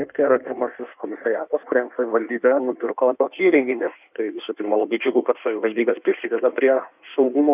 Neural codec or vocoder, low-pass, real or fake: codec, 16 kHz in and 24 kHz out, 1.1 kbps, FireRedTTS-2 codec; 3.6 kHz; fake